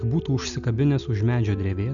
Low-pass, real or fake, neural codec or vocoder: 7.2 kHz; real; none